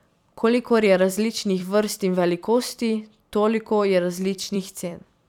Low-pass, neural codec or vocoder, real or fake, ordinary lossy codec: 19.8 kHz; vocoder, 44.1 kHz, 128 mel bands every 256 samples, BigVGAN v2; fake; none